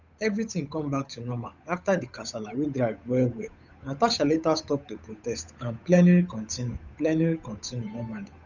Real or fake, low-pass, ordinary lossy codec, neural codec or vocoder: fake; 7.2 kHz; none; codec, 16 kHz, 8 kbps, FunCodec, trained on Chinese and English, 25 frames a second